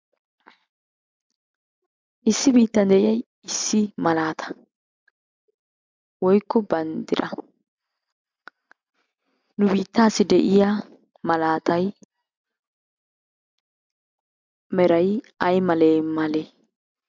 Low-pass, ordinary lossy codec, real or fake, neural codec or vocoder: 7.2 kHz; MP3, 64 kbps; real; none